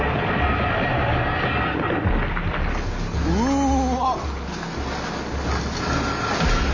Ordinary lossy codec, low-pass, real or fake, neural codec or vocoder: none; 7.2 kHz; real; none